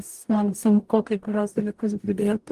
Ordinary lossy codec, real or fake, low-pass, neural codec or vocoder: Opus, 24 kbps; fake; 14.4 kHz; codec, 44.1 kHz, 0.9 kbps, DAC